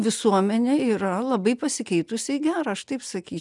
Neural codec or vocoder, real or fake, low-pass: none; real; 10.8 kHz